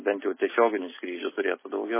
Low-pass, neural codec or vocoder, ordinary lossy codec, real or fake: 3.6 kHz; none; MP3, 16 kbps; real